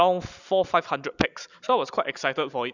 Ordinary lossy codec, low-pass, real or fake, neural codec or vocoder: none; 7.2 kHz; real; none